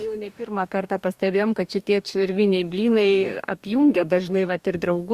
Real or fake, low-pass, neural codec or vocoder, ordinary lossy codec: fake; 14.4 kHz; codec, 44.1 kHz, 2.6 kbps, DAC; Opus, 64 kbps